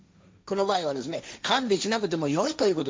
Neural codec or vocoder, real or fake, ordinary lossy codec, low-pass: codec, 16 kHz, 1.1 kbps, Voila-Tokenizer; fake; none; none